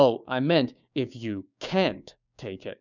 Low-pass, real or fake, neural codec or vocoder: 7.2 kHz; fake; codec, 16 kHz, 6 kbps, DAC